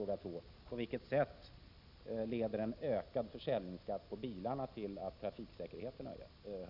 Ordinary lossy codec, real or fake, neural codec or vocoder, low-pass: none; real; none; 5.4 kHz